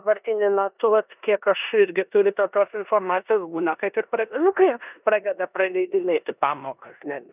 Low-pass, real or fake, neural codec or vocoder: 3.6 kHz; fake; codec, 16 kHz in and 24 kHz out, 0.9 kbps, LongCat-Audio-Codec, four codebook decoder